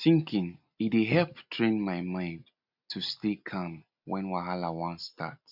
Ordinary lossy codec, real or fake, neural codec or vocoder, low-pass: none; real; none; 5.4 kHz